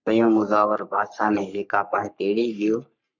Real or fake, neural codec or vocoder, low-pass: fake; codec, 44.1 kHz, 3.4 kbps, Pupu-Codec; 7.2 kHz